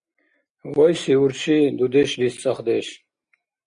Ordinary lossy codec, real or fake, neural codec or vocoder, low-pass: Opus, 64 kbps; real; none; 9.9 kHz